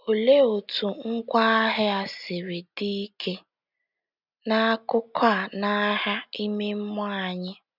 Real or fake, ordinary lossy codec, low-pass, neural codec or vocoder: real; none; 5.4 kHz; none